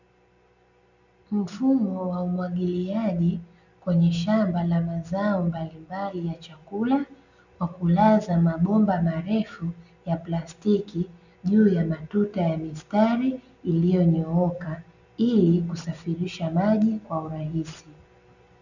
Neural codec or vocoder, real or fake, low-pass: none; real; 7.2 kHz